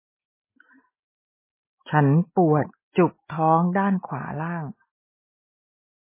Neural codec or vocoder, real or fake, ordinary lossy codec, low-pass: none; real; MP3, 16 kbps; 3.6 kHz